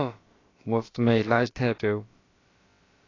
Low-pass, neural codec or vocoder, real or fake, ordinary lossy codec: 7.2 kHz; codec, 16 kHz, about 1 kbps, DyCAST, with the encoder's durations; fake; AAC, 32 kbps